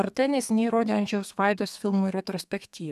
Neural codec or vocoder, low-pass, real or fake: codec, 32 kHz, 1.9 kbps, SNAC; 14.4 kHz; fake